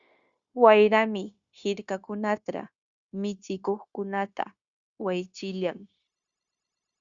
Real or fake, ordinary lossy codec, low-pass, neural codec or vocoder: fake; Opus, 64 kbps; 7.2 kHz; codec, 16 kHz, 0.9 kbps, LongCat-Audio-Codec